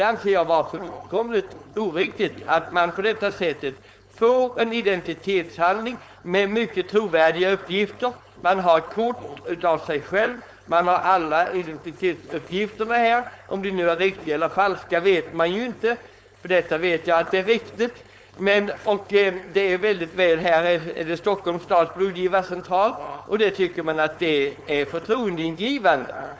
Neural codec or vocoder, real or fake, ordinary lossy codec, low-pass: codec, 16 kHz, 4.8 kbps, FACodec; fake; none; none